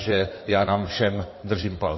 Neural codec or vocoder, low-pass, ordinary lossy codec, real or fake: codec, 16 kHz in and 24 kHz out, 2.2 kbps, FireRedTTS-2 codec; 7.2 kHz; MP3, 24 kbps; fake